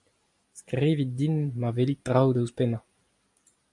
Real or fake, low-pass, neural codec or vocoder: real; 10.8 kHz; none